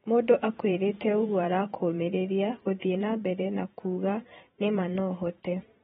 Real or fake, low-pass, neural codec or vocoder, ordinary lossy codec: fake; 19.8 kHz; vocoder, 44.1 kHz, 128 mel bands every 512 samples, BigVGAN v2; AAC, 16 kbps